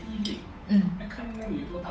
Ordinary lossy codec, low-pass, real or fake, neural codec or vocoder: none; none; fake; codec, 16 kHz, 8 kbps, FunCodec, trained on Chinese and English, 25 frames a second